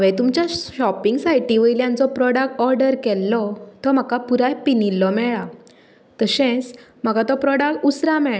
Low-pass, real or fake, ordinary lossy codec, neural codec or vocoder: none; real; none; none